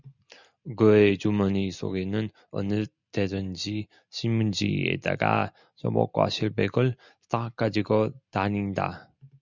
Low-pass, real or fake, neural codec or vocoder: 7.2 kHz; real; none